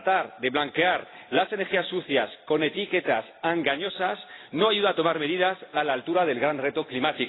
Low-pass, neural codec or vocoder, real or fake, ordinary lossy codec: 7.2 kHz; none; real; AAC, 16 kbps